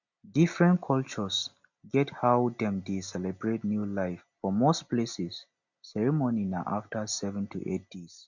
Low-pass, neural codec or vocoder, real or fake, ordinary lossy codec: 7.2 kHz; none; real; none